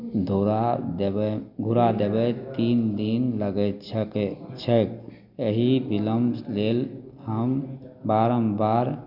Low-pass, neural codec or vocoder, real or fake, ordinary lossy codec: 5.4 kHz; none; real; none